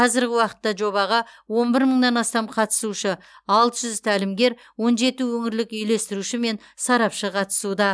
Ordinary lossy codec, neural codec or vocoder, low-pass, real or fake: none; none; none; real